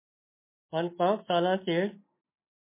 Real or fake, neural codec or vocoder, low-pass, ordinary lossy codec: real; none; 3.6 kHz; MP3, 24 kbps